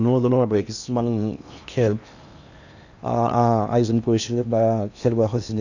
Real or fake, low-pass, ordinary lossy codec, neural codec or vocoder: fake; 7.2 kHz; none; codec, 16 kHz in and 24 kHz out, 0.8 kbps, FocalCodec, streaming, 65536 codes